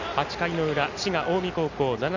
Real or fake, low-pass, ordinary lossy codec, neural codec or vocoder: real; 7.2 kHz; none; none